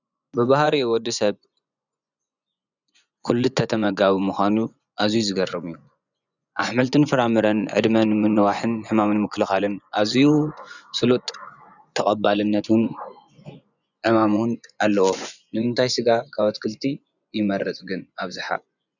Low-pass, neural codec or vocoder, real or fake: 7.2 kHz; vocoder, 24 kHz, 100 mel bands, Vocos; fake